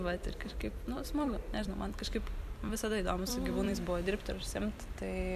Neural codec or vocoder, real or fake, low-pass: vocoder, 44.1 kHz, 128 mel bands every 256 samples, BigVGAN v2; fake; 14.4 kHz